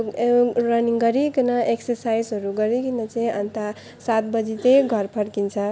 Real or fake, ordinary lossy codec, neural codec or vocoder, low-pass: real; none; none; none